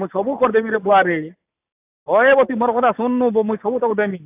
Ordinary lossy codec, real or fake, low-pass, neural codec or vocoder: AAC, 32 kbps; real; 3.6 kHz; none